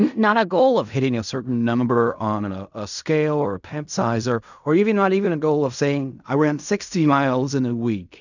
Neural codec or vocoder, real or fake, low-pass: codec, 16 kHz in and 24 kHz out, 0.4 kbps, LongCat-Audio-Codec, fine tuned four codebook decoder; fake; 7.2 kHz